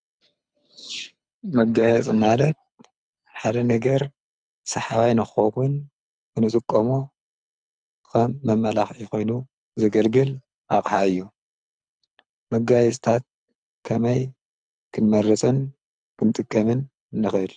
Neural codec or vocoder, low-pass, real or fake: codec, 24 kHz, 6 kbps, HILCodec; 9.9 kHz; fake